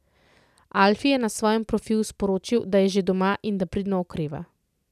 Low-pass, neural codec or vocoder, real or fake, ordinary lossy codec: 14.4 kHz; none; real; none